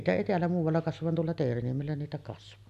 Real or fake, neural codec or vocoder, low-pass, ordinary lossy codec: real; none; 14.4 kHz; none